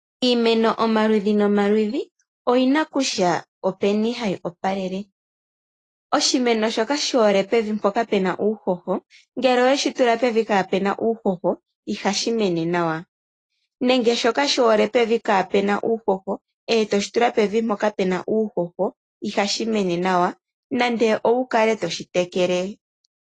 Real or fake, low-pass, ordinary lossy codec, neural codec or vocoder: real; 10.8 kHz; AAC, 32 kbps; none